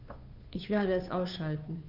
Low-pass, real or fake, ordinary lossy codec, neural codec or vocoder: 5.4 kHz; fake; none; codec, 16 kHz, 2 kbps, FunCodec, trained on Chinese and English, 25 frames a second